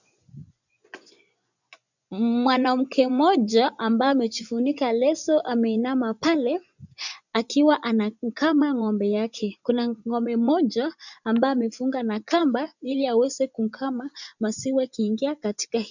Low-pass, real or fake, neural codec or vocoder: 7.2 kHz; fake; vocoder, 24 kHz, 100 mel bands, Vocos